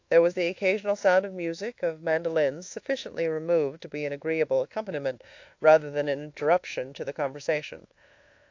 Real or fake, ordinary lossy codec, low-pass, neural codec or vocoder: fake; AAC, 48 kbps; 7.2 kHz; codec, 24 kHz, 1.2 kbps, DualCodec